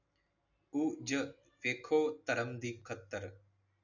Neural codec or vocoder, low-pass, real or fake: none; 7.2 kHz; real